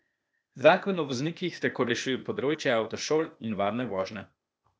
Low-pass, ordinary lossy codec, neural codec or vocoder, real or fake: none; none; codec, 16 kHz, 0.8 kbps, ZipCodec; fake